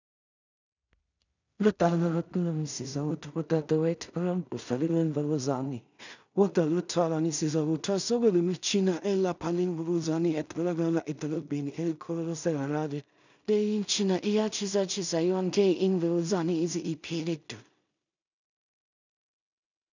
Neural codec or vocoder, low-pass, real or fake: codec, 16 kHz in and 24 kHz out, 0.4 kbps, LongCat-Audio-Codec, two codebook decoder; 7.2 kHz; fake